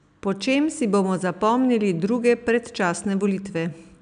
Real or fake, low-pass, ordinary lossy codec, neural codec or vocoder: real; 9.9 kHz; none; none